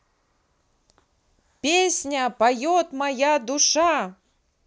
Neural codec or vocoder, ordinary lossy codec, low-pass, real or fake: none; none; none; real